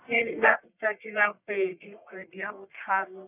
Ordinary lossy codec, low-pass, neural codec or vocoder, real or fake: none; 3.6 kHz; codec, 44.1 kHz, 1.7 kbps, Pupu-Codec; fake